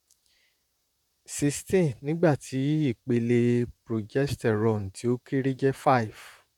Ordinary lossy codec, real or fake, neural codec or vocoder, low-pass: none; fake; vocoder, 44.1 kHz, 128 mel bands, Pupu-Vocoder; 19.8 kHz